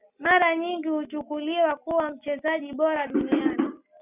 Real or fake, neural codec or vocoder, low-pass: real; none; 3.6 kHz